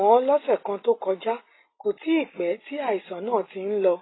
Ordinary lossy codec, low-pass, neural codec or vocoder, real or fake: AAC, 16 kbps; 7.2 kHz; none; real